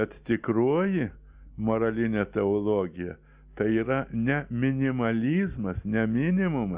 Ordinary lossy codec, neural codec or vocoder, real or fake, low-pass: Opus, 64 kbps; none; real; 3.6 kHz